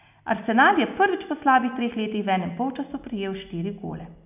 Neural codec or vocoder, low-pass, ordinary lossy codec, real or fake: none; 3.6 kHz; none; real